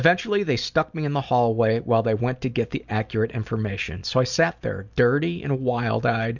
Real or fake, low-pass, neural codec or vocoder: real; 7.2 kHz; none